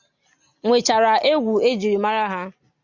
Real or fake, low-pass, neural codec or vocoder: real; 7.2 kHz; none